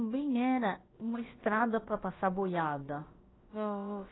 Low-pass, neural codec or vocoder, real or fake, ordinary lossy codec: 7.2 kHz; codec, 16 kHz, about 1 kbps, DyCAST, with the encoder's durations; fake; AAC, 16 kbps